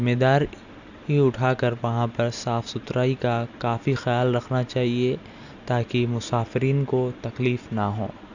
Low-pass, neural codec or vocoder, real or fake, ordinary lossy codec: 7.2 kHz; none; real; none